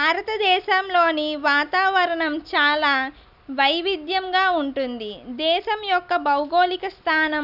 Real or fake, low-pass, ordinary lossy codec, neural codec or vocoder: real; 5.4 kHz; none; none